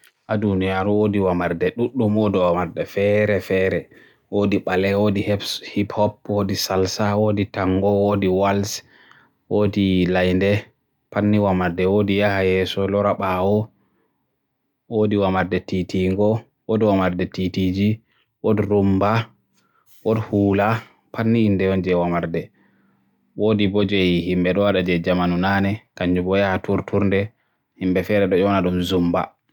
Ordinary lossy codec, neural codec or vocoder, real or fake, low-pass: none; none; real; 19.8 kHz